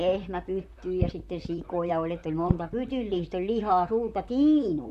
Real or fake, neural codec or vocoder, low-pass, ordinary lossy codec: fake; vocoder, 44.1 kHz, 128 mel bands, Pupu-Vocoder; 14.4 kHz; none